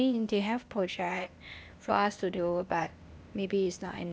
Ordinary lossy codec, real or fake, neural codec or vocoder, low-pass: none; fake; codec, 16 kHz, 0.8 kbps, ZipCodec; none